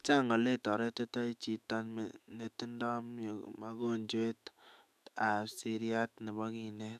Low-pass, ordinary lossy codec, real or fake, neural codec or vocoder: 14.4 kHz; none; fake; autoencoder, 48 kHz, 128 numbers a frame, DAC-VAE, trained on Japanese speech